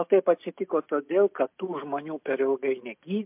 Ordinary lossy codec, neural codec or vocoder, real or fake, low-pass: MP3, 32 kbps; none; real; 3.6 kHz